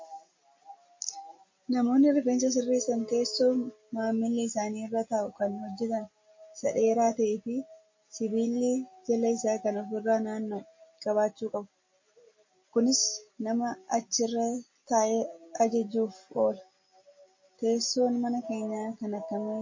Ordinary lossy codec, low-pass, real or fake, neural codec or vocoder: MP3, 32 kbps; 7.2 kHz; real; none